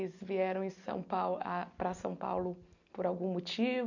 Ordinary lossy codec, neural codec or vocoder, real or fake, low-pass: none; none; real; 7.2 kHz